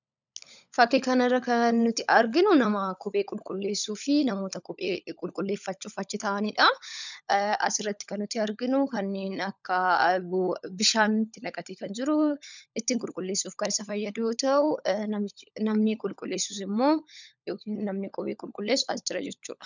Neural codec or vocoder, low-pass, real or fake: codec, 16 kHz, 16 kbps, FunCodec, trained on LibriTTS, 50 frames a second; 7.2 kHz; fake